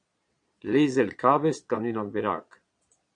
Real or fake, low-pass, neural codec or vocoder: fake; 9.9 kHz; vocoder, 22.05 kHz, 80 mel bands, Vocos